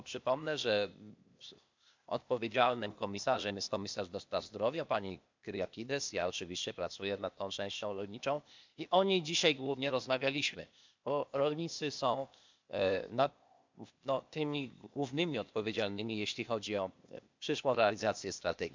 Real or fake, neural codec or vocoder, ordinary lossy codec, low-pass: fake; codec, 16 kHz, 0.8 kbps, ZipCodec; MP3, 64 kbps; 7.2 kHz